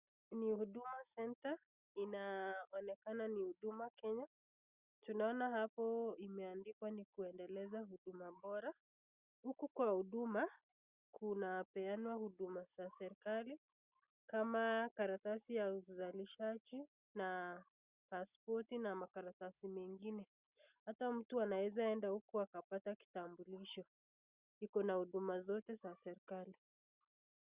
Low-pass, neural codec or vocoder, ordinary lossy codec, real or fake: 3.6 kHz; none; Opus, 64 kbps; real